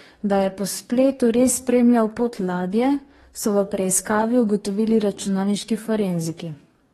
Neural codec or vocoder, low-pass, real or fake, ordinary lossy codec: codec, 44.1 kHz, 2.6 kbps, DAC; 19.8 kHz; fake; AAC, 32 kbps